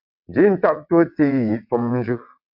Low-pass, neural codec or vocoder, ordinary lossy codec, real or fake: 5.4 kHz; vocoder, 22.05 kHz, 80 mel bands, WaveNeXt; AAC, 48 kbps; fake